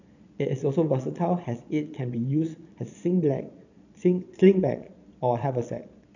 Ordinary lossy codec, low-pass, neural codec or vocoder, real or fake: none; 7.2 kHz; vocoder, 22.05 kHz, 80 mel bands, Vocos; fake